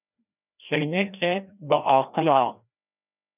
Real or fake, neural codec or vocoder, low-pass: fake; codec, 16 kHz, 1 kbps, FreqCodec, larger model; 3.6 kHz